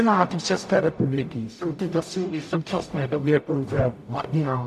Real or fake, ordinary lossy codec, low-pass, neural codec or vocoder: fake; AAC, 96 kbps; 14.4 kHz; codec, 44.1 kHz, 0.9 kbps, DAC